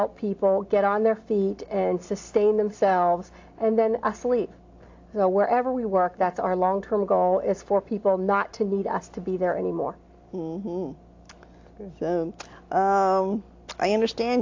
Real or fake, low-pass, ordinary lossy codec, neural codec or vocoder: real; 7.2 kHz; AAC, 48 kbps; none